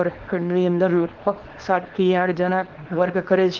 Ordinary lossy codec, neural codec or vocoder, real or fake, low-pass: Opus, 16 kbps; codec, 24 kHz, 0.9 kbps, WavTokenizer, small release; fake; 7.2 kHz